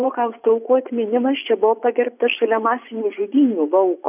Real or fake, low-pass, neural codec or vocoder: fake; 3.6 kHz; vocoder, 24 kHz, 100 mel bands, Vocos